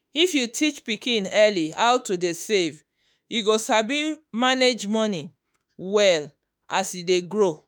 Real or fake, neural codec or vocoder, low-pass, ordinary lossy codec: fake; autoencoder, 48 kHz, 32 numbers a frame, DAC-VAE, trained on Japanese speech; none; none